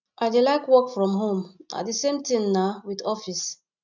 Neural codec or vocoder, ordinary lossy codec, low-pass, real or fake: none; none; 7.2 kHz; real